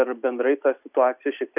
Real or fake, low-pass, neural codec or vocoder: real; 3.6 kHz; none